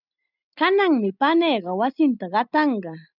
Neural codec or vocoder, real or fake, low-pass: none; real; 5.4 kHz